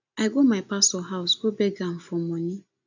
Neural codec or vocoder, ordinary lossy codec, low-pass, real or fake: none; none; 7.2 kHz; real